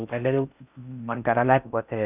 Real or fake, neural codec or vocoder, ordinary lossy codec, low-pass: fake; codec, 16 kHz in and 24 kHz out, 0.6 kbps, FocalCodec, streaming, 4096 codes; none; 3.6 kHz